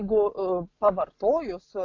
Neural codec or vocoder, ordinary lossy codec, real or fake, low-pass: none; AAC, 48 kbps; real; 7.2 kHz